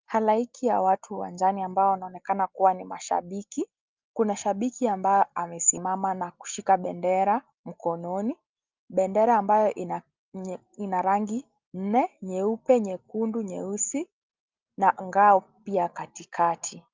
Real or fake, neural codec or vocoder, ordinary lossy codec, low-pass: real; none; Opus, 24 kbps; 7.2 kHz